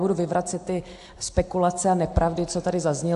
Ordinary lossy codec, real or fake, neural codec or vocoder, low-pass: AAC, 64 kbps; real; none; 10.8 kHz